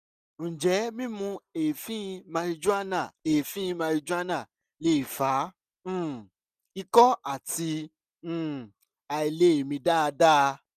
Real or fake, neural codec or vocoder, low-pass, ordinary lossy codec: real; none; 14.4 kHz; none